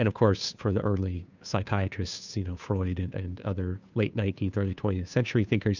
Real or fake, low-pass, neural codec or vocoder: fake; 7.2 kHz; codec, 16 kHz, 2 kbps, FunCodec, trained on Chinese and English, 25 frames a second